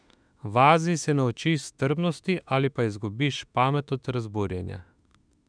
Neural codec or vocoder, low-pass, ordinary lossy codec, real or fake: autoencoder, 48 kHz, 32 numbers a frame, DAC-VAE, trained on Japanese speech; 9.9 kHz; none; fake